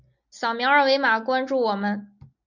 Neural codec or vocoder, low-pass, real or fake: none; 7.2 kHz; real